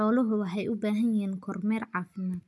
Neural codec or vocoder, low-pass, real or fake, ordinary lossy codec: none; none; real; none